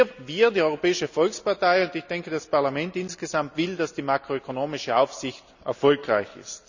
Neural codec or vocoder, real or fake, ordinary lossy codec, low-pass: none; real; none; 7.2 kHz